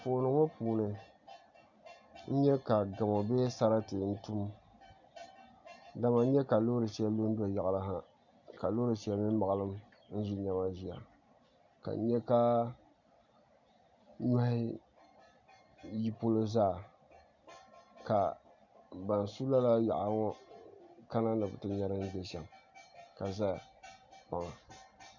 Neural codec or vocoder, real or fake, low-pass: none; real; 7.2 kHz